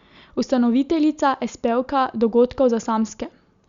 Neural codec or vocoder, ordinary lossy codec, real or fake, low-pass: none; Opus, 64 kbps; real; 7.2 kHz